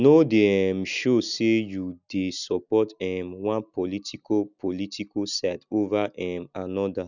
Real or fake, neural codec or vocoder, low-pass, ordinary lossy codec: real; none; 7.2 kHz; none